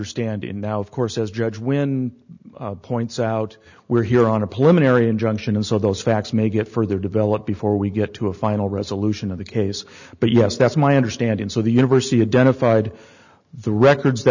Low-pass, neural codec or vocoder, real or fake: 7.2 kHz; none; real